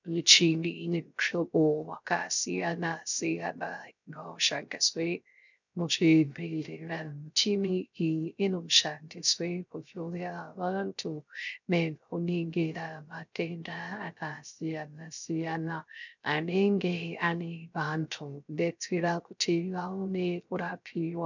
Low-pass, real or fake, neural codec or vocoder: 7.2 kHz; fake; codec, 16 kHz, 0.3 kbps, FocalCodec